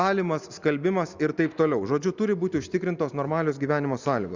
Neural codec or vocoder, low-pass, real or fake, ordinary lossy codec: none; 7.2 kHz; real; Opus, 64 kbps